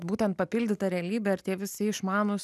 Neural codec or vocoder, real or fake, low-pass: none; real; 14.4 kHz